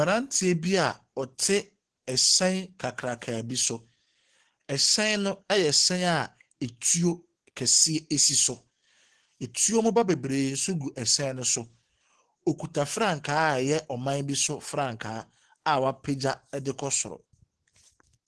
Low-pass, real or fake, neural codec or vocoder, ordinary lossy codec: 10.8 kHz; fake; codec, 44.1 kHz, 7.8 kbps, DAC; Opus, 16 kbps